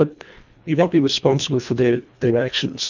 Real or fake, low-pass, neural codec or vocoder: fake; 7.2 kHz; codec, 24 kHz, 1.5 kbps, HILCodec